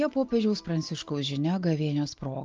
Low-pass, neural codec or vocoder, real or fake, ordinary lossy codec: 7.2 kHz; none; real; Opus, 32 kbps